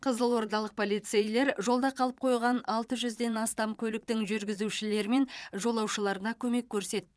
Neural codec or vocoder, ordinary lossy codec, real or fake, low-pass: vocoder, 22.05 kHz, 80 mel bands, Vocos; none; fake; none